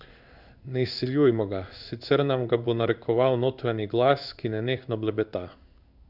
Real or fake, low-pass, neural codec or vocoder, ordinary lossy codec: fake; 5.4 kHz; codec, 16 kHz in and 24 kHz out, 1 kbps, XY-Tokenizer; none